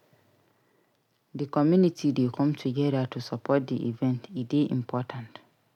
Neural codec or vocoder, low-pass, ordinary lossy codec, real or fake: none; 19.8 kHz; none; real